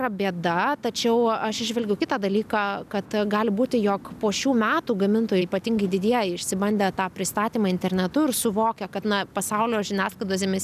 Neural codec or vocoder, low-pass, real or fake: none; 14.4 kHz; real